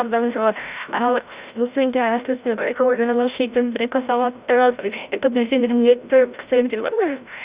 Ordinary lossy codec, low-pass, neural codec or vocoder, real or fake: Opus, 64 kbps; 3.6 kHz; codec, 16 kHz, 0.5 kbps, FreqCodec, larger model; fake